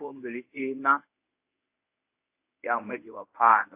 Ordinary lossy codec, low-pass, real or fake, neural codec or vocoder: AAC, 24 kbps; 3.6 kHz; fake; codec, 24 kHz, 0.9 kbps, WavTokenizer, medium speech release version 1